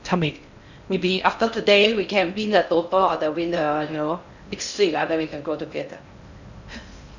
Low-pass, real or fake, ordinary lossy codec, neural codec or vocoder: 7.2 kHz; fake; none; codec, 16 kHz in and 24 kHz out, 0.6 kbps, FocalCodec, streaming, 2048 codes